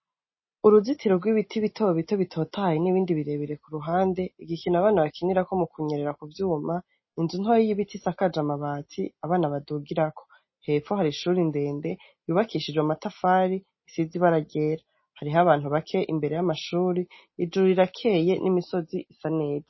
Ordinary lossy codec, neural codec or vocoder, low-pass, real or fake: MP3, 24 kbps; none; 7.2 kHz; real